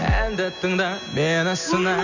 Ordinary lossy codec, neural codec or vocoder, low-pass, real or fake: none; none; 7.2 kHz; real